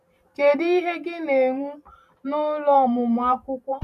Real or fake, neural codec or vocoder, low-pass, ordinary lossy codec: fake; vocoder, 44.1 kHz, 128 mel bands every 512 samples, BigVGAN v2; 14.4 kHz; none